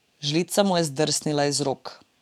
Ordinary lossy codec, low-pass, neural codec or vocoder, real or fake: none; 19.8 kHz; codec, 44.1 kHz, 7.8 kbps, DAC; fake